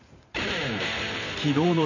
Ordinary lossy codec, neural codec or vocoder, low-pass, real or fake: none; vocoder, 44.1 kHz, 128 mel bands every 512 samples, BigVGAN v2; 7.2 kHz; fake